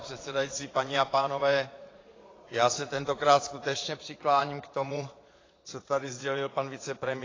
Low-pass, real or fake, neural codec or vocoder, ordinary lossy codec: 7.2 kHz; fake; vocoder, 22.05 kHz, 80 mel bands, Vocos; AAC, 32 kbps